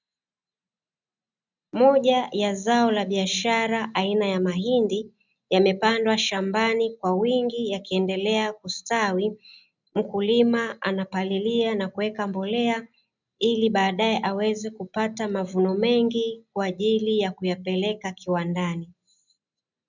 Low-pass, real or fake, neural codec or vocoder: 7.2 kHz; real; none